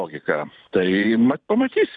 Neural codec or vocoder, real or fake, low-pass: vocoder, 44.1 kHz, 128 mel bands every 512 samples, BigVGAN v2; fake; 9.9 kHz